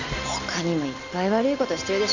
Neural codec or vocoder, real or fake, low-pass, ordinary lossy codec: none; real; 7.2 kHz; none